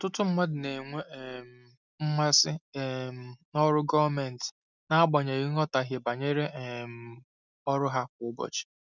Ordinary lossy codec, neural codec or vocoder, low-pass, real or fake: none; none; 7.2 kHz; real